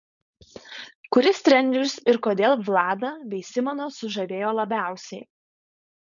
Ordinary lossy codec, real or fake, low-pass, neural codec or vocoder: MP3, 96 kbps; fake; 7.2 kHz; codec, 16 kHz, 4.8 kbps, FACodec